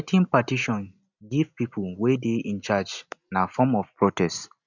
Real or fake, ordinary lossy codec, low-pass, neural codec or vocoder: real; none; 7.2 kHz; none